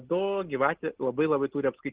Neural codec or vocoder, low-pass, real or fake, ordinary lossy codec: none; 3.6 kHz; real; Opus, 16 kbps